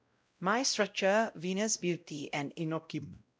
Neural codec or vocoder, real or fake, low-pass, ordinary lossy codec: codec, 16 kHz, 0.5 kbps, X-Codec, WavLM features, trained on Multilingual LibriSpeech; fake; none; none